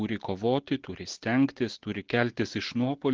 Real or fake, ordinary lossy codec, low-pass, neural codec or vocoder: real; Opus, 16 kbps; 7.2 kHz; none